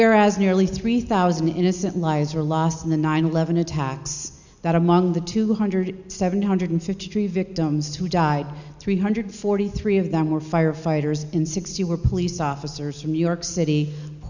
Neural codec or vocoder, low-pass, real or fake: none; 7.2 kHz; real